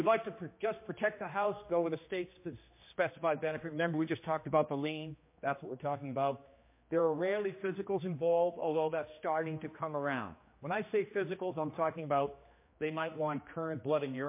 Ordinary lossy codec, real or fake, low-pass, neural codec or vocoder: MP3, 24 kbps; fake; 3.6 kHz; codec, 16 kHz, 2 kbps, X-Codec, HuBERT features, trained on general audio